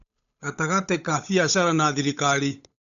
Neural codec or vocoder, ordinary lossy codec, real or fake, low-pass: codec, 16 kHz, 8 kbps, FunCodec, trained on Chinese and English, 25 frames a second; none; fake; 7.2 kHz